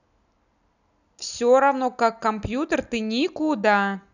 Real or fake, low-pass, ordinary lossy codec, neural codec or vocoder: real; 7.2 kHz; none; none